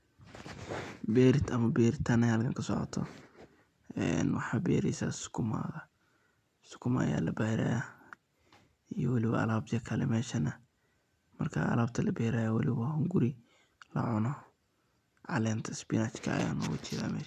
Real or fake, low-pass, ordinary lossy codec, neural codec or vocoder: real; 14.4 kHz; none; none